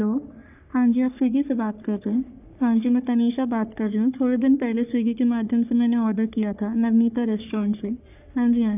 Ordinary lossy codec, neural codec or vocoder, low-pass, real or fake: none; codec, 44.1 kHz, 3.4 kbps, Pupu-Codec; 3.6 kHz; fake